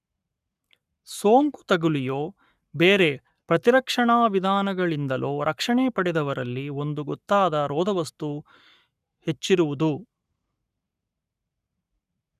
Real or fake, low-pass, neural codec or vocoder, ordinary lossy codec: fake; 14.4 kHz; codec, 44.1 kHz, 7.8 kbps, Pupu-Codec; none